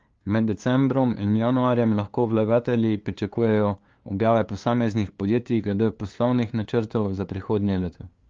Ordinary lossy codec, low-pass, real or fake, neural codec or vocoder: Opus, 32 kbps; 7.2 kHz; fake; codec, 16 kHz, 2 kbps, FunCodec, trained on LibriTTS, 25 frames a second